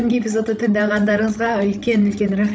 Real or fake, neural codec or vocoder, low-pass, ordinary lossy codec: fake; codec, 16 kHz, 16 kbps, FreqCodec, larger model; none; none